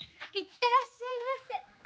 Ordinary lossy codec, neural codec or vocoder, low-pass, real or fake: none; codec, 16 kHz, 2 kbps, X-Codec, HuBERT features, trained on general audio; none; fake